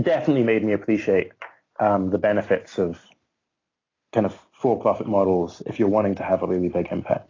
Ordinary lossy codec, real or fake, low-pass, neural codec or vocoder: AAC, 32 kbps; real; 7.2 kHz; none